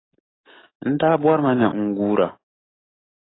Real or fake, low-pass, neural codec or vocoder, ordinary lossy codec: fake; 7.2 kHz; codec, 44.1 kHz, 7.8 kbps, DAC; AAC, 16 kbps